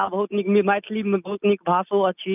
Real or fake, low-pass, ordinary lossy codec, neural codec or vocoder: real; 3.6 kHz; none; none